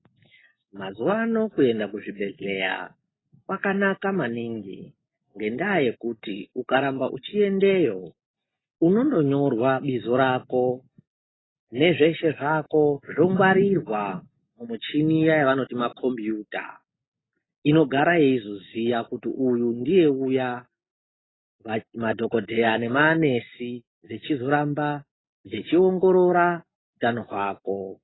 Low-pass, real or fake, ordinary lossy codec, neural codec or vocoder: 7.2 kHz; real; AAC, 16 kbps; none